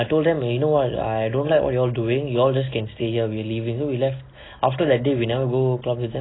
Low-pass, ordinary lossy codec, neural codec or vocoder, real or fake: 7.2 kHz; AAC, 16 kbps; none; real